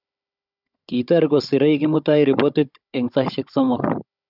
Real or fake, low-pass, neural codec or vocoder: fake; 5.4 kHz; codec, 16 kHz, 16 kbps, FunCodec, trained on Chinese and English, 50 frames a second